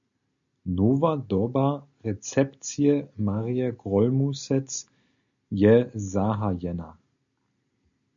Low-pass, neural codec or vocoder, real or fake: 7.2 kHz; none; real